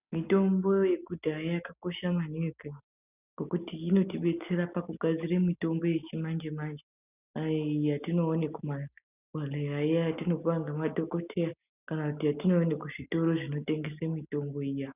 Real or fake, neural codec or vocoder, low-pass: real; none; 3.6 kHz